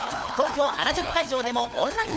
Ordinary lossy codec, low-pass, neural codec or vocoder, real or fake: none; none; codec, 16 kHz, 4 kbps, FunCodec, trained on LibriTTS, 50 frames a second; fake